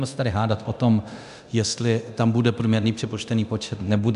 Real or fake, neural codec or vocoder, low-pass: fake; codec, 24 kHz, 0.9 kbps, DualCodec; 10.8 kHz